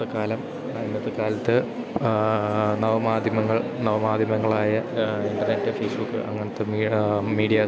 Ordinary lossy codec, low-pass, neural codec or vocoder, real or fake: none; none; none; real